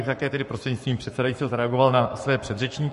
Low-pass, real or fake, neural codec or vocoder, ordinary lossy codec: 14.4 kHz; fake; codec, 44.1 kHz, 7.8 kbps, Pupu-Codec; MP3, 48 kbps